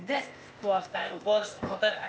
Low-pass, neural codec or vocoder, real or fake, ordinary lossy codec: none; codec, 16 kHz, 0.8 kbps, ZipCodec; fake; none